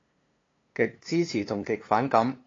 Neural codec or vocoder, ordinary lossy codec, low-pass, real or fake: codec, 16 kHz, 2 kbps, FunCodec, trained on LibriTTS, 25 frames a second; AAC, 32 kbps; 7.2 kHz; fake